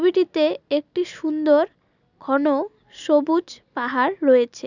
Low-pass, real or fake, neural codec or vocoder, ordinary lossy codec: 7.2 kHz; real; none; none